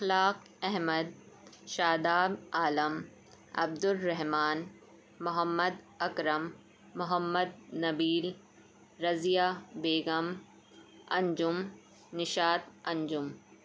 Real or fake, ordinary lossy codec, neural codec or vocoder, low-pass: real; none; none; none